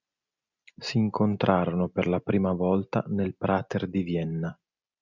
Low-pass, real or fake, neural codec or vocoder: 7.2 kHz; real; none